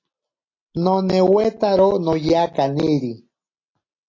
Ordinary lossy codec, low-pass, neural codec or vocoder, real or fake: AAC, 32 kbps; 7.2 kHz; none; real